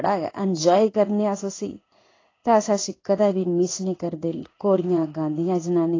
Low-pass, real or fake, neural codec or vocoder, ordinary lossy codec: 7.2 kHz; fake; codec, 16 kHz in and 24 kHz out, 1 kbps, XY-Tokenizer; AAC, 32 kbps